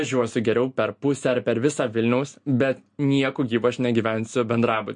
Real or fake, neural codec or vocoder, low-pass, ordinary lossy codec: real; none; 9.9 kHz; MP3, 48 kbps